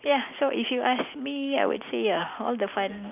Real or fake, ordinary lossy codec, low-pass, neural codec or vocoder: real; none; 3.6 kHz; none